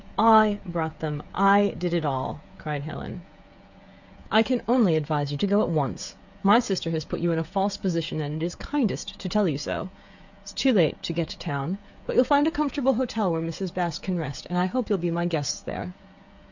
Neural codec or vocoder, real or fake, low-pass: codec, 16 kHz, 16 kbps, FreqCodec, smaller model; fake; 7.2 kHz